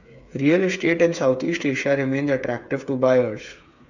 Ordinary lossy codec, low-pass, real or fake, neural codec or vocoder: none; 7.2 kHz; fake; codec, 16 kHz, 8 kbps, FreqCodec, smaller model